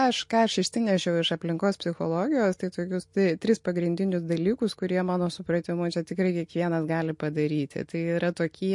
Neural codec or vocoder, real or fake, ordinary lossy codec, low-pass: none; real; MP3, 48 kbps; 10.8 kHz